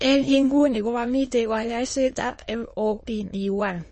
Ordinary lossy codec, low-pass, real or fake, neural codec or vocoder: MP3, 32 kbps; 9.9 kHz; fake; autoencoder, 22.05 kHz, a latent of 192 numbers a frame, VITS, trained on many speakers